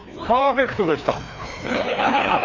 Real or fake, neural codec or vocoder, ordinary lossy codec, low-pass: fake; codec, 16 kHz, 2 kbps, FreqCodec, larger model; none; 7.2 kHz